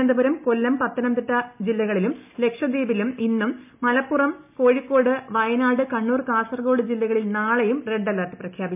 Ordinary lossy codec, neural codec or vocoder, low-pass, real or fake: MP3, 32 kbps; none; 3.6 kHz; real